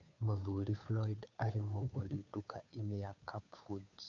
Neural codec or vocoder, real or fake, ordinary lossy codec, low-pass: codec, 16 kHz, 2 kbps, FunCodec, trained on Chinese and English, 25 frames a second; fake; none; 7.2 kHz